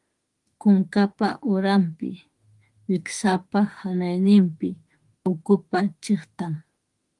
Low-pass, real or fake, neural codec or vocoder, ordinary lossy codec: 10.8 kHz; fake; autoencoder, 48 kHz, 32 numbers a frame, DAC-VAE, trained on Japanese speech; Opus, 24 kbps